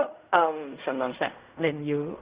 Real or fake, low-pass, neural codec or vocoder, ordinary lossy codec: fake; 3.6 kHz; codec, 16 kHz in and 24 kHz out, 0.4 kbps, LongCat-Audio-Codec, fine tuned four codebook decoder; Opus, 64 kbps